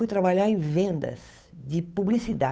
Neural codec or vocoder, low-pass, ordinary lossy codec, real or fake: codec, 16 kHz, 8 kbps, FunCodec, trained on Chinese and English, 25 frames a second; none; none; fake